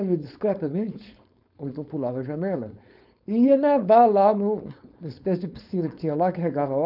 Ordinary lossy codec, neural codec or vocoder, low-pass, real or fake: none; codec, 16 kHz, 4.8 kbps, FACodec; 5.4 kHz; fake